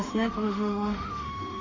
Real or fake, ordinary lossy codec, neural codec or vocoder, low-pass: fake; MP3, 64 kbps; autoencoder, 48 kHz, 32 numbers a frame, DAC-VAE, trained on Japanese speech; 7.2 kHz